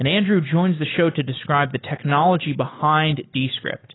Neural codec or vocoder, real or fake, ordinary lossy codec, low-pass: none; real; AAC, 16 kbps; 7.2 kHz